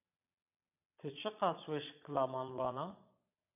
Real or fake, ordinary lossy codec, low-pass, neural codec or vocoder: fake; AAC, 24 kbps; 3.6 kHz; vocoder, 22.05 kHz, 80 mel bands, Vocos